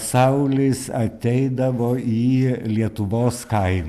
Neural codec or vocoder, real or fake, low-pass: none; real; 14.4 kHz